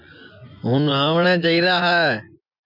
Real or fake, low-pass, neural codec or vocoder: fake; 5.4 kHz; vocoder, 24 kHz, 100 mel bands, Vocos